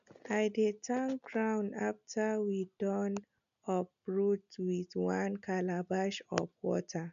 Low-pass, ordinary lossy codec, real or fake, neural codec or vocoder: 7.2 kHz; none; real; none